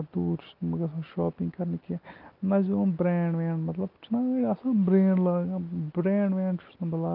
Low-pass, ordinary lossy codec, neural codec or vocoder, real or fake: 5.4 kHz; Opus, 24 kbps; none; real